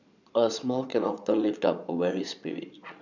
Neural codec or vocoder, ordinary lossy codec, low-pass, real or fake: vocoder, 22.05 kHz, 80 mel bands, Vocos; none; 7.2 kHz; fake